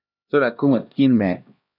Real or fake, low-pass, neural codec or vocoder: fake; 5.4 kHz; codec, 16 kHz, 1 kbps, X-Codec, HuBERT features, trained on LibriSpeech